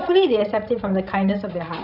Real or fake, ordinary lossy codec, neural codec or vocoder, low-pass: fake; none; codec, 16 kHz, 16 kbps, FreqCodec, larger model; 5.4 kHz